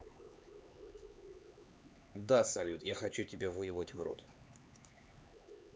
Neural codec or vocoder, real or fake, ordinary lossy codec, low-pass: codec, 16 kHz, 4 kbps, X-Codec, HuBERT features, trained on LibriSpeech; fake; none; none